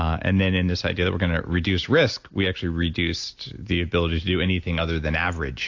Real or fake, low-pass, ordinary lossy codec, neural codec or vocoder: real; 7.2 kHz; AAC, 48 kbps; none